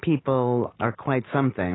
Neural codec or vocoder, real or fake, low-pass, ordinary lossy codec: none; real; 7.2 kHz; AAC, 16 kbps